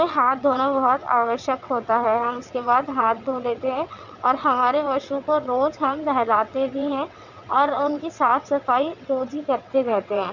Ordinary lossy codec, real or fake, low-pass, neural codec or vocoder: none; fake; 7.2 kHz; vocoder, 22.05 kHz, 80 mel bands, WaveNeXt